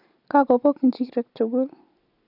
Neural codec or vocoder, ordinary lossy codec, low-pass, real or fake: none; none; 5.4 kHz; real